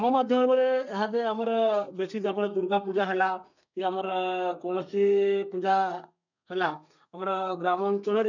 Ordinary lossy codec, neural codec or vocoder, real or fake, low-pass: none; codec, 32 kHz, 1.9 kbps, SNAC; fake; 7.2 kHz